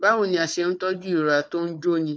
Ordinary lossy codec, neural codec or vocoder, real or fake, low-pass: none; codec, 16 kHz, 4 kbps, FunCodec, trained on Chinese and English, 50 frames a second; fake; none